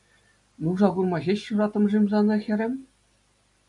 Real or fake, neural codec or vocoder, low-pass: real; none; 10.8 kHz